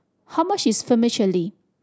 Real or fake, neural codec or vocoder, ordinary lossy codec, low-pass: real; none; none; none